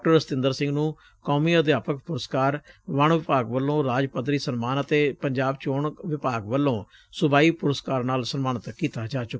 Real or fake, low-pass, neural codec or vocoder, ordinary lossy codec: real; none; none; none